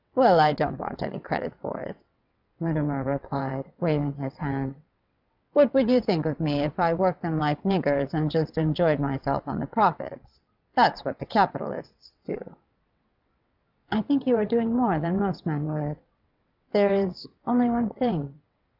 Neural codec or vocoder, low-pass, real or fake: vocoder, 22.05 kHz, 80 mel bands, WaveNeXt; 5.4 kHz; fake